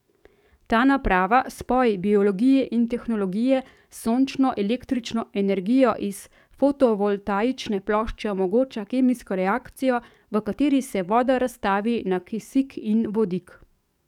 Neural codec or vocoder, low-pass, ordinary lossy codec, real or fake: codec, 44.1 kHz, 7.8 kbps, DAC; 19.8 kHz; none; fake